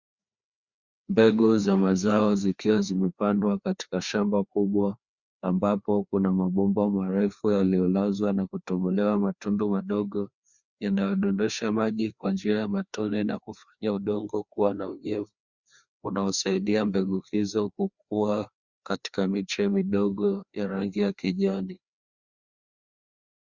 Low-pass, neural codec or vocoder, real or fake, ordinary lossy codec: 7.2 kHz; codec, 16 kHz, 2 kbps, FreqCodec, larger model; fake; Opus, 64 kbps